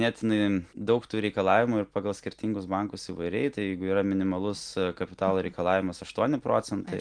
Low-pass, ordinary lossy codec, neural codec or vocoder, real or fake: 9.9 kHz; Opus, 24 kbps; none; real